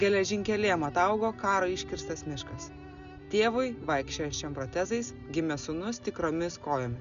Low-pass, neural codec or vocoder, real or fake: 7.2 kHz; none; real